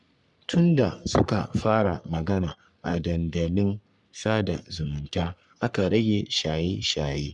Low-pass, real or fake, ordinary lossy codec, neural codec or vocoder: 10.8 kHz; fake; none; codec, 44.1 kHz, 3.4 kbps, Pupu-Codec